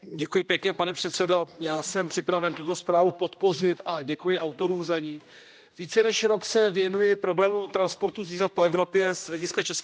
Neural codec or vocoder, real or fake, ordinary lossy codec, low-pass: codec, 16 kHz, 1 kbps, X-Codec, HuBERT features, trained on general audio; fake; none; none